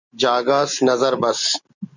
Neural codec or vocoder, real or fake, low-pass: none; real; 7.2 kHz